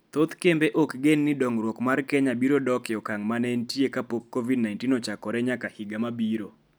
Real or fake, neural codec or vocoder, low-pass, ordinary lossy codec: real; none; none; none